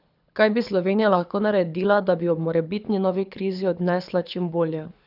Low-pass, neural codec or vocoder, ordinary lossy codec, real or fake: 5.4 kHz; codec, 24 kHz, 6 kbps, HILCodec; none; fake